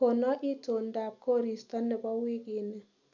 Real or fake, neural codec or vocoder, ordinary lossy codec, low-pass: real; none; none; 7.2 kHz